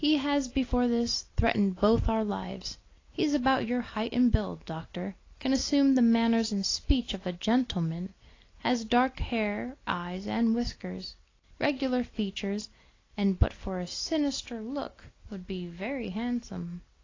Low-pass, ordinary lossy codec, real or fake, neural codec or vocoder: 7.2 kHz; AAC, 32 kbps; real; none